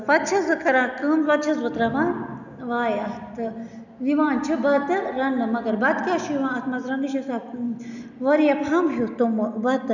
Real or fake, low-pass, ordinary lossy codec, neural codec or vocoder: real; 7.2 kHz; none; none